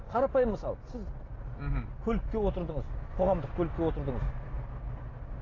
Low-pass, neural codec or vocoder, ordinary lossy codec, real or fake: 7.2 kHz; none; AAC, 32 kbps; real